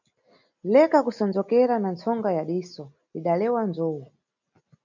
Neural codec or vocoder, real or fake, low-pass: none; real; 7.2 kHz